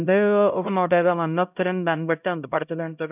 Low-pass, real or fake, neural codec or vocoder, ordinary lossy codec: 3.6 kHz; fake; codec, 16 kHz, 0.5 kbps, X-Codec, HuBERT features, trained on LibriSpeech; none